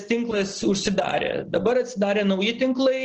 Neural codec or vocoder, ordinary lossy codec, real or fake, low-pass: none; Opus, 16 kbps; real; 7.2 kHz